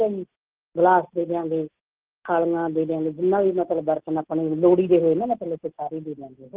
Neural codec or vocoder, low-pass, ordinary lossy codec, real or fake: none; 3.6 kHz; Opus, 16 kbps; real